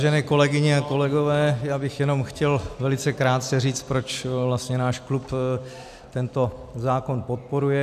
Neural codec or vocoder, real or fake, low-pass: none; real; 14.4 kHz